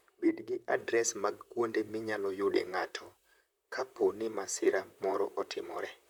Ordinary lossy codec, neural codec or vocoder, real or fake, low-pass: none; vocoder, 44.1 kHz, 128 mel bands, Pupu-Vocoder; fake; none